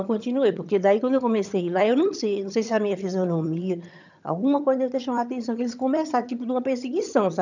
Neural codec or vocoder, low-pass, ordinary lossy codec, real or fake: vocoder, 22.05 kHz, 80 mel bands, HiFi-GAN; 7.2 kHz; none; fake